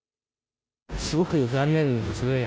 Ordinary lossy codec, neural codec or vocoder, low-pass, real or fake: none; codec, 16 kHz, 0.5 kbps, FunCodec, trained on Chinese and English, 25 frames a second; none; fake